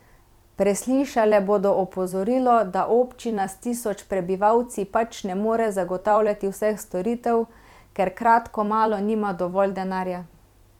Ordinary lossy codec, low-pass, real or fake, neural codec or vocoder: MP3, 96 kbps; 19.8 kHz; fake; vocoder, 44.1 kHz, 128 mel bands every 256 samples, BigVGAN v2